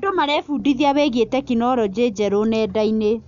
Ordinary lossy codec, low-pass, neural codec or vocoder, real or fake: none; 7.2 kHz; none; real